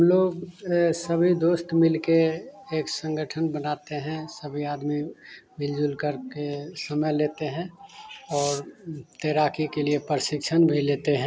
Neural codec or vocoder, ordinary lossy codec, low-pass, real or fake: none; none; none; real